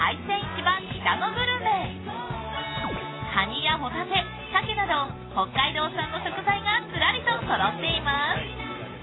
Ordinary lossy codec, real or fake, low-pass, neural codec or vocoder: AAC, 16 kbps; real; 7.2 kHz; none